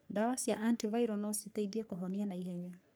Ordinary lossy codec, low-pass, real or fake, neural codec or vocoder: none; none; fake; codec, 44.1 kHz, 3.4 kbps, Pupu-Codec